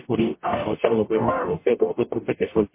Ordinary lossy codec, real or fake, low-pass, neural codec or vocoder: MP3, 24 kbps; fake; 3.6 kHz; codec, 44.1 kHz, 0.9 kbps, DAC